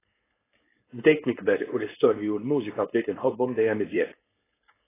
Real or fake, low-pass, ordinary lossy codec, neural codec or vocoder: fake; 3.6 kHz; AAC, 16 kbps; codec, 16 kHz, 4.8 kbps, FACodec